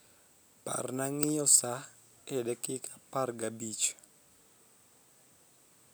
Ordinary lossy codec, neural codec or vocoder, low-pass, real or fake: none; none; none; real